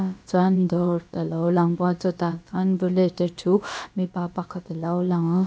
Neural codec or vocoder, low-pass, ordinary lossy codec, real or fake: codec, 16 kHz, about 1 kbps, DyCAST, with the encoder's durations; none; none; fake